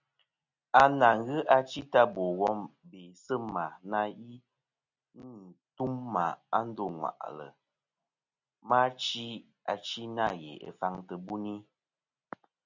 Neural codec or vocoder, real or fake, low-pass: none; real; 7.2 kHz